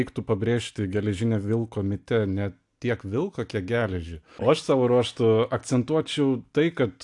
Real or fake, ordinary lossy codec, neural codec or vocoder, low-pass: real; AAC, 64 kbps; none; 10.8 kHz